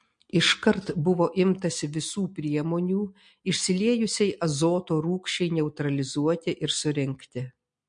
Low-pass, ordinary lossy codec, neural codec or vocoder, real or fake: 9.9 kHz; MP3, 48 kbps; none; real